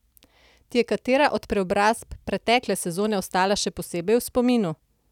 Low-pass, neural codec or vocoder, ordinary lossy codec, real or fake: 19.8 kHz; none; none; real